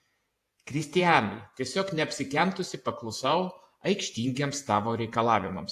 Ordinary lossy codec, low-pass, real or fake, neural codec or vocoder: AAC, 64 kbps; 14.4 kHz; fake; vocoder, 48 kHz, 128 mel bands, Vocos